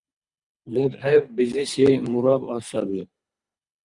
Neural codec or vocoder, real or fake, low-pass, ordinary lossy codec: codec, 24 kHz, 3 kbps, HILCodec; fake; 10.8 kHz; Opus, 64 kbps